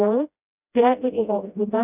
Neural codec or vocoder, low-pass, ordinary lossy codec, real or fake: codec, 16 kHz, 0.5 kbps, FreqCodec, smaller model; 3.6 kHz; none; fake